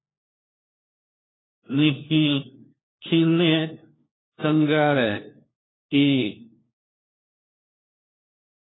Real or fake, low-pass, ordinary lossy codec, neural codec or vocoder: fake; 7.2 kHz; AAC, 16 kbps; codec, 16 kHz, 1 kbps, FunCodec, trained on LibriTTS, 50 frames a second